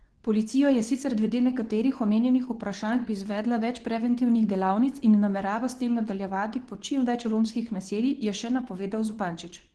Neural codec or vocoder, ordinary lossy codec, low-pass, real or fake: codec, 24 kHz, 0.9 kbps, WavTokenizer, medium speech release version 2; Opus, 16 kbps; 10.8 kHz; fake